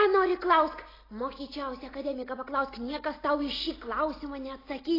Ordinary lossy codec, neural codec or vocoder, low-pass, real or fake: AAC, 24 kbps; none; 5.4 kHz; real